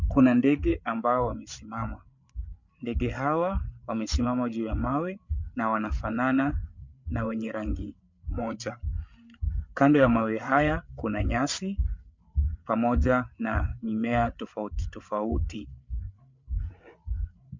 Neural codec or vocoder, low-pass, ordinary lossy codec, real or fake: codec, 16 kHz, 8 kbps, FreqCodec, larger model; 7.2 kHz; MP3, 64 kbps; fake